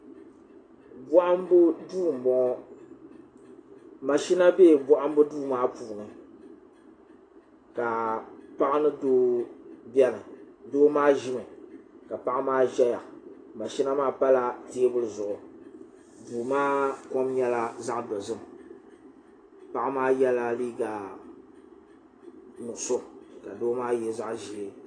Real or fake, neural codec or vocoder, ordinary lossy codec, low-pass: real; none; AAC, 32 kbps; 9.9 kHz